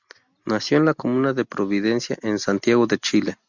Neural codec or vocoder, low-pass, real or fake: none; 7.2 kHz; real